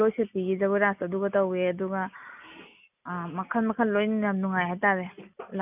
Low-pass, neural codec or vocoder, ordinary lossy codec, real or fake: 3.6 kHz; none; none; real